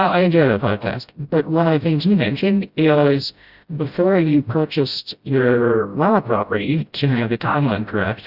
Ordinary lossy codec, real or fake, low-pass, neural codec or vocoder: Opus, 64 kbps; fake; 5.4 kHz; codec, 16 kHz, 0.5 kbps, FreqCodec, smaller model